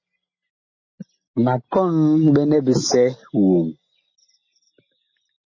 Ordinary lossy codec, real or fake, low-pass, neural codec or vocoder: MP3, 32 kbps; real; 7.2 kHz; none